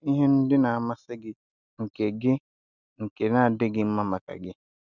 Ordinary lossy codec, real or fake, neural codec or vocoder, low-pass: none; real; none; 7.2 kHz